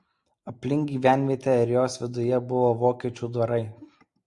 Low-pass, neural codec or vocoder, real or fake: 10.8 kHz; none; real